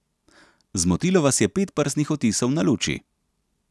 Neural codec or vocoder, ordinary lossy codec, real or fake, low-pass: none; none; real; none